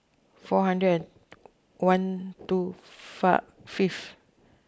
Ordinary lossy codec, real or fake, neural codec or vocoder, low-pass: none; real; none; none